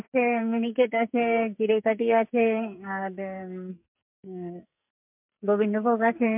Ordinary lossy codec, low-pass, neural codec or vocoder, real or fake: MP3, 32 kbps; 3.6 kHz; codec, 44.1 kHz, 2.6 kbps, SNAC; fake